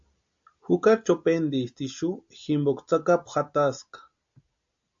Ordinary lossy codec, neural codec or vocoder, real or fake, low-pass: AAC, 64 kbps; none; real; 7.2 kHz